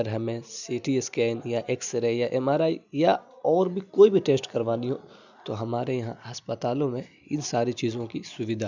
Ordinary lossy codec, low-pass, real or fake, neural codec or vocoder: none; 7.2 kHz; real; none